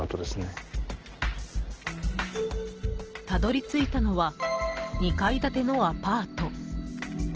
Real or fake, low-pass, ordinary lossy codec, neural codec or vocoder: real; 7.2 kHz; Opus, 16 kbps; none